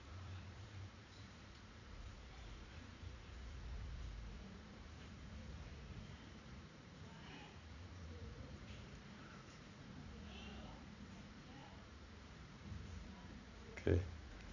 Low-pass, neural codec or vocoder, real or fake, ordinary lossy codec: 7.2 kHz; vocoder, 44.1 kHz, 80 mel bands, Vocos; fake; none